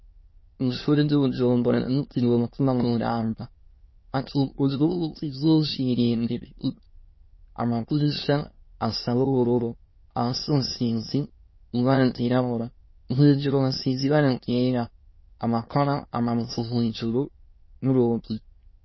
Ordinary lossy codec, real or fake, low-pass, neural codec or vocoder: MP3, 24 kbps; fake; 7.2 kHz; autoencoder, 22.05 kHz, a latent of 192 numbers a frame, VITS, trained on many speakers